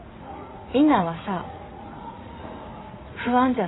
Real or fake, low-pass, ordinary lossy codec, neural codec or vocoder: fake; 7.2 kHz; AAC, 16 kbps; vocoder, 44.1 kHz, 80 mel bands, Vocos